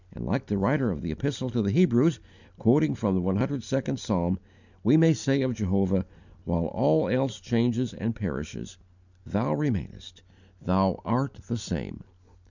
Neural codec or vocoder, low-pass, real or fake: none; 7.2 kHz; real